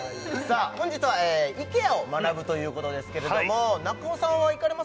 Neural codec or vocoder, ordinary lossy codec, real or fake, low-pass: none; none; real; none